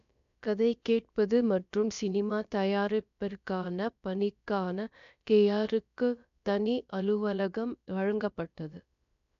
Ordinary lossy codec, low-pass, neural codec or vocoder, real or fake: none; 7.2 kHz; codec, 16 kHz, about 1 kbps, DyCAST, with the encoder's durations; fake